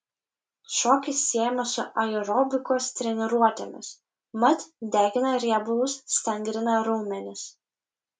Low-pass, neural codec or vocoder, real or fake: 9.9 kHz; none; real